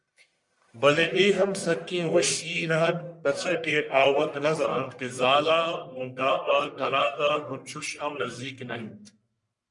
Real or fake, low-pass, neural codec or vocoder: fake; 10.8 kHz; codec, 44.1 kHz, 1.7 kbps, Pupu-Codec